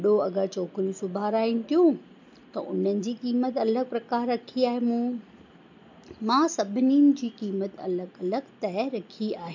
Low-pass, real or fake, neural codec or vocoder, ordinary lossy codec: 7.2 kHz; real; none; MP3, 64 kbps